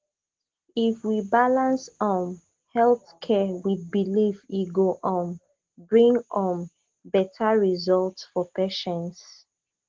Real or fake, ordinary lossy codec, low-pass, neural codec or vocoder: real; Opus, 16 kbps; 7.2 kHz; none